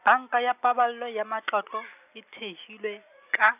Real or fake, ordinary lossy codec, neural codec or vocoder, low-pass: real; none; none; 3.6 kHz